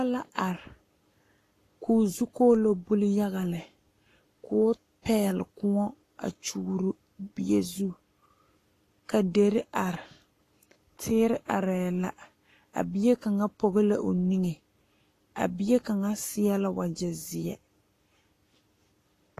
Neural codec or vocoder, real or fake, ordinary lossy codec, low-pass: codec, 44.1 kHz, 7.8 kbps, Pupu-Codec; fake; AAC, 48 kbps; 14.4 kHz